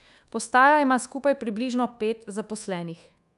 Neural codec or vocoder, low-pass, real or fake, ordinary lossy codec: codec, 24 kHz, 1.2 kbps, DualCodec; 10.8 kHz; fake; none